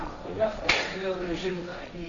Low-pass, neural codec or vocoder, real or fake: 7.2 kHz; codec, 16 kHz, 1.1 kbps, Voila-Tokenizer; fake